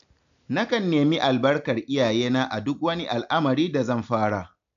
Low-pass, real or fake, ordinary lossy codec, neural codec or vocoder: 7.2 kHz; real; AAC, 96 kbps; none